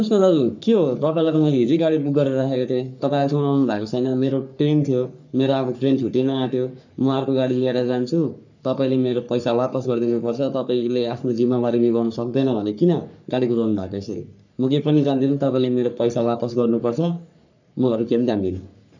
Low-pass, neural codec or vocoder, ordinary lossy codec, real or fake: 7.2 kHz; codec, 44.1 kHz, 3.4 kbps, Pupu-Codec; none; fake